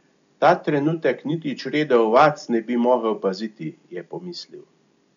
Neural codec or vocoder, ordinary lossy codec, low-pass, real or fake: none; none; 7.2 kHz; real